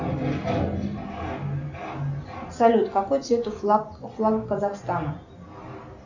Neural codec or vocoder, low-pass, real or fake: none; 7.2 kHz; real